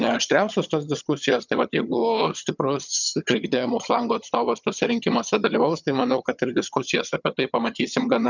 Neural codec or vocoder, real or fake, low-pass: vocoder, 22.05 kHz, 80 mel bands, HiFi-GAN; fake; 7.2 kHz